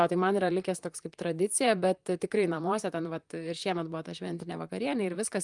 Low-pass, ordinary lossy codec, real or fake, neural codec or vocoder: 10.8 kHz; Opus, 32 kbps; fake; vocoder, 44.1 kHz, 128 mel bands, Pupu-Vocoder